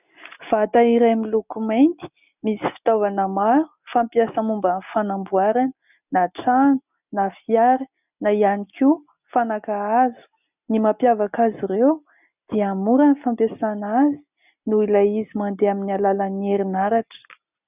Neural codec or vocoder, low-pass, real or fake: none; 3.6 kHz; real